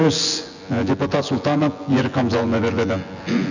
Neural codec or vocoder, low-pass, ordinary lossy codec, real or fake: vocoder, 24 kHz, 100 mel bands, Vocos; 7.2 kHz; none; fake